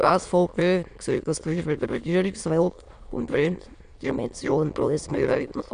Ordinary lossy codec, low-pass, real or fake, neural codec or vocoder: Opus, 64 kbps; 9.9 kHz; fake; autoencoder, 22.05 kHz, a latent of 192 numbers a frame, VITS, trained on many speakers